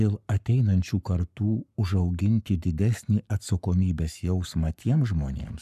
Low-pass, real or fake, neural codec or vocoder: 14.4 kHz; fake; codec, 44.1 kHz, 7.8 kbps, Pupu-Codec